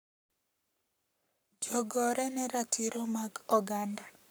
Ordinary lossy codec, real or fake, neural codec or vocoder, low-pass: none; fake; codec, 44.1 kHz, 7.8 kbps, Pupu-Codec; none